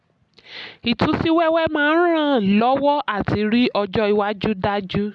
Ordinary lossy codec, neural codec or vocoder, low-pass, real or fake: none; none; 10.8 kHz; real